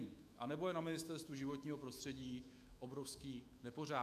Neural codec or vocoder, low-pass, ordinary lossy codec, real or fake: autoencoder, 48 kHz, 128 numbers a frame, DAC-VAE, trained on Japanese speech; 14.4 kHz; MP3, 96 kbps; fake